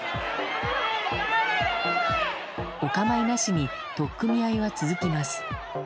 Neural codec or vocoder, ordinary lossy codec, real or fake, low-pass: none; none; real; none